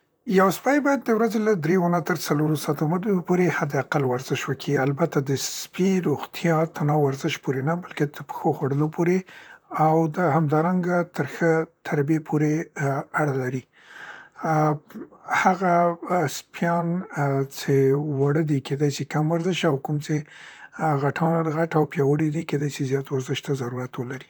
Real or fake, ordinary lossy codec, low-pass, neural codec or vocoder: fake; none; none; vocoder, 44.1 kHz, 128 mel bands, Pupu-Vocoder